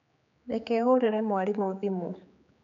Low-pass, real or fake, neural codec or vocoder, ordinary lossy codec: 7.2 kHz; fake; codec, 16 kHz, 4 kbps, X-Codec, HuBERT features, trained on general audio; none